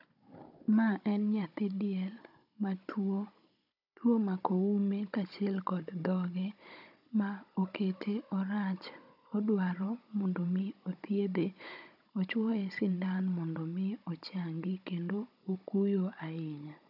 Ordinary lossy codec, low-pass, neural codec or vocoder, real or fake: none; 5.4 kHz; codec, 16 kHz, 16 kbps, FunCodec, trained on Chinese and English, 50 frames a second; fake